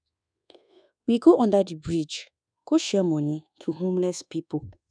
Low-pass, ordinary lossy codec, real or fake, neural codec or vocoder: 9.9 kHz; none; fake; codec, 24 kHz, 1.2 kbps, DualCodec